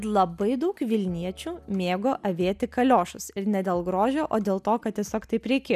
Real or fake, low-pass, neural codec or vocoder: real; 14.4 kHz; none